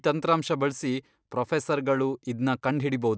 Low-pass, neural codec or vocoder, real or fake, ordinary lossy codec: none; none; real; none